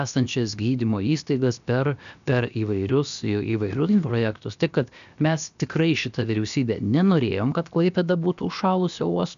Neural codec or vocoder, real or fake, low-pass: codec, 16 kHz, 0.7 kbps, FocalCodec; fake; 7.2 kHz